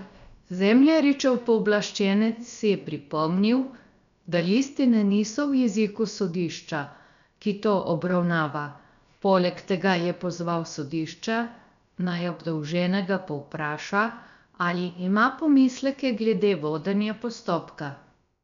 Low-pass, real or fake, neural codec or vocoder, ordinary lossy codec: 7.2 kHz; fake; codec, 16 kHz, about 1 kbps, DyCAST, with the encoder's durations; none